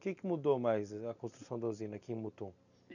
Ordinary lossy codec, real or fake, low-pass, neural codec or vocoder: none; real; 7.2 kHz; none